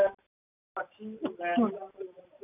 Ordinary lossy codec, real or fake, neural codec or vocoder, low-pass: none; real; none; 3.6 kHz